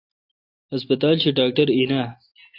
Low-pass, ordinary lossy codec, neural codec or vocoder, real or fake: 5.4 kHz; AAC, 32 kbps; none; real